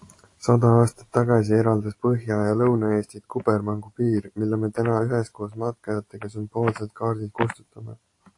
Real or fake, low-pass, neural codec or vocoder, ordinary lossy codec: real; 10.8 kHz; none; AAC, 48 kbps